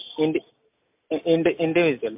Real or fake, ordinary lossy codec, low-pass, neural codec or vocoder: real; MP3, 32 kbps; 3.6 kHz; none